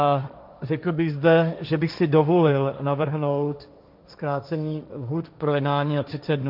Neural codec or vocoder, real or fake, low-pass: codec, 16 kHz, 1.1 kbps, Voila-Tokenizer; fake; 5.4 kHz